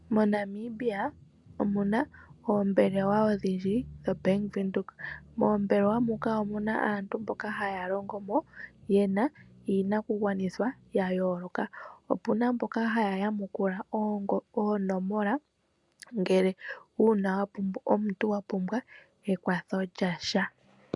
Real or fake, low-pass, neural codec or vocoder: real; 10.8 kHz; none